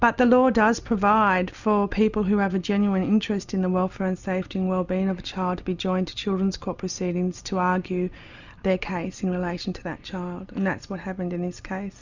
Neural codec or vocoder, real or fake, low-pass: none; real; 7.2 kHz